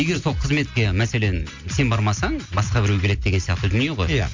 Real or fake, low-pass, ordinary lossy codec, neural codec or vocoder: real; 7.2 kHz; MP3, 64 kbps; none